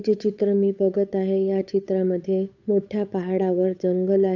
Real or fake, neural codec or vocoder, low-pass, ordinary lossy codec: fake; codec, 16 kHz, 8 kbps, FunCodec, trained on Chinese and English, 25 frames a second; 7.2 kHz; none